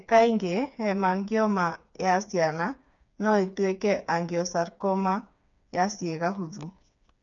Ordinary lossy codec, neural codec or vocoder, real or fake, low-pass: none; codec, 16 kHz, 4 kbps, FreqCodec, smaller model; fake; 7.2 kHz